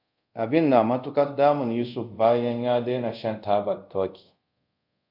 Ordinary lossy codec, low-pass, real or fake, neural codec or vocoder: AAC, 48 kbps; 5.4 kHz; fake; codec, 24 kHz, 0.5 kbps, DualCodec